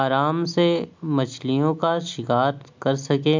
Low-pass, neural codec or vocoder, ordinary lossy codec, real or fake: 7.2 kHz; none; MP3, 64 kbps; real